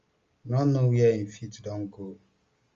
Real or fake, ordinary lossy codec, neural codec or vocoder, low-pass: real; Opus, 32 kbps; none; 7.2 kHz